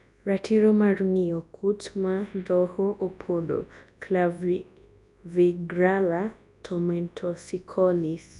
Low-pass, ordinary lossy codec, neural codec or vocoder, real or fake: 10.8 kHz; none; codec, 24 kHz, 0.9 kbps, WavTokenizer, large speech release; fake